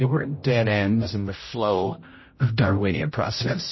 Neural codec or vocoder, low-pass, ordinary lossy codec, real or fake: codec, 16 kHz, 0.5 kbps, X-Codec, HuBERT features, trained on general audio; 7.2 kHz; MP3, 24 kbps; fake